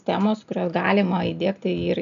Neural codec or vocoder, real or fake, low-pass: none; real; 7.2 kHz